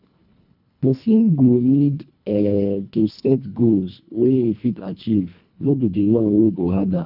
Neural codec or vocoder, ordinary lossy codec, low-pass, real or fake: codec, 24 kHz, 1.5 kbps, HILCodec; none; 5.4 kHz; fake